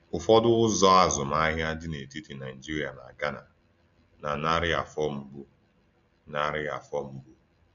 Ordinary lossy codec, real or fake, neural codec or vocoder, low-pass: MP3, 96 kbps; real; none; 7.2 kHz